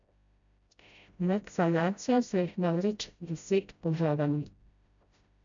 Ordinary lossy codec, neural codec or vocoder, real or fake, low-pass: MP3, 96 kbps; codec, 16 kHz, 0.5 kbps, FreqCodec, smaller model; fake; 7.2 kHz